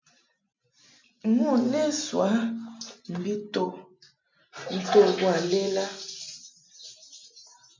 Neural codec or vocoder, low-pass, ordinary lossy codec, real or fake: none; 7.2 kHz; MP3, 64 kbps; real